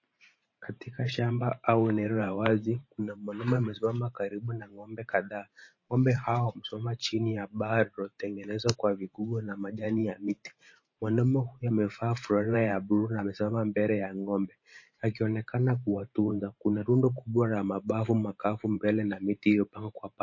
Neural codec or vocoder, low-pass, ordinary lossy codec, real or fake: vocoder, 44.1 kHz, 128 mel bands every 512 samples, BigVGAN v2; 7.2 kHz; MP3, 32 kbps; fake